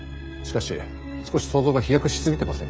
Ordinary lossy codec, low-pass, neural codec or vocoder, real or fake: none; none; codec, 16 kHz, 16 kbps, FreqCodec, smaller model; fake